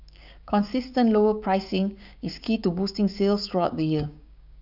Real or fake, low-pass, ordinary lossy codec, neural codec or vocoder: fake; 5.4 kHz; none; codec, 16 kHz, 6 kbps, DAC